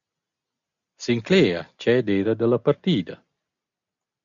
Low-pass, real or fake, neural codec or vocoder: 7.2 kHz; real; none